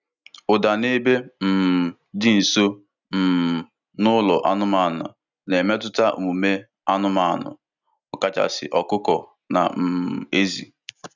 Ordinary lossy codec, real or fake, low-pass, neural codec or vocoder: none; real; 7.2 kHz; none